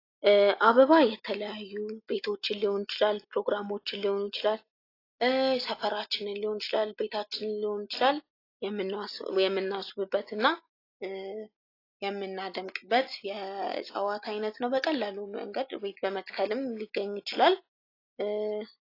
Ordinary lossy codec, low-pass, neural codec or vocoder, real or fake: AAC, 32 kbps; 5.4 kHz; none; real